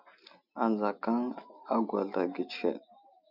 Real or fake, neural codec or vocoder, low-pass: real; none; 5.4 kHz